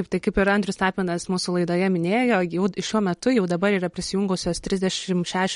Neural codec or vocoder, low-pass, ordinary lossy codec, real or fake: none; 10.8 kHz; MP3, 48 kbps; real